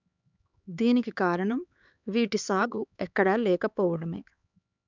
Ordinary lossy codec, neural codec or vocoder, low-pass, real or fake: none; codec, 16 kHz, 4 kbps, X-Codec, HuBERT features, trained on LibriSpeech; 7.2 kHz; fake